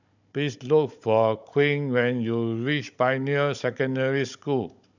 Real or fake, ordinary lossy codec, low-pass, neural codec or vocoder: fake; none; 7.2 kHz; codec, 16 kHz, 16 kbps, FunCodec, trained on Chinese and English, 50 frames a second